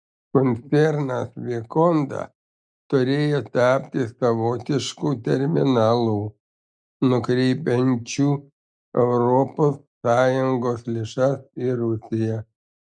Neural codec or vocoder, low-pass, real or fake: none; 9.9 kHz; real